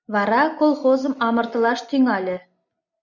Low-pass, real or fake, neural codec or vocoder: 7.2 kHz; real; none